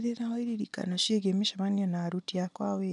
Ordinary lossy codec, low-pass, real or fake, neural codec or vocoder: none; 10.8 kHz; real; none